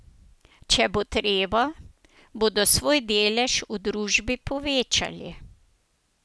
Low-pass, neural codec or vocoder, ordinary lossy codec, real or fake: none; none; none; real